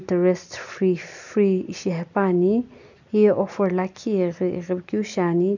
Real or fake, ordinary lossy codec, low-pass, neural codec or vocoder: real; none; 7.2 kHz; none